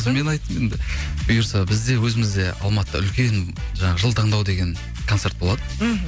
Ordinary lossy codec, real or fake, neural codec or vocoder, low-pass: none; real; none; none